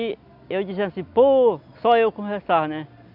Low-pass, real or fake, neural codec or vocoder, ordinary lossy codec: 5.4 kHz; real; none; none